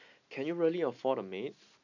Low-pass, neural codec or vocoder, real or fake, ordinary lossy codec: 7.2 kHz; none; real; none